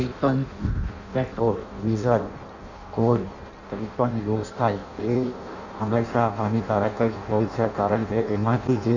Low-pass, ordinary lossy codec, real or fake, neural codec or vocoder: 7.2 kHz; none; fake; codec, 16 kHz in and 24 kHz out, 0.6 kbps, FireRedTTS-2 codec